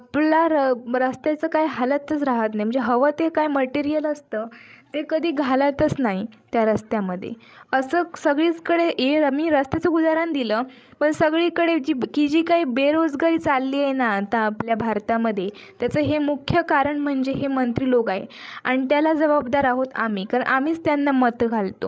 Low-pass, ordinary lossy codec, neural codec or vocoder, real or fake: none; none; codec, 16 kHz, 16 kbps, FreqCodec, larger model; fake